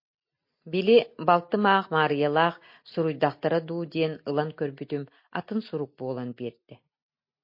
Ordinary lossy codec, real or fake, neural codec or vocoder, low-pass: MP3, 32 kbps; real; none; 5.4 kHz